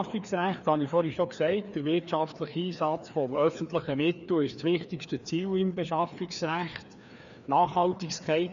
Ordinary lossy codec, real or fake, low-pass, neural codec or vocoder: none; fake; 7.2 kHz; codec, 16 kHz, 2 kbps, FreqCodec, larger model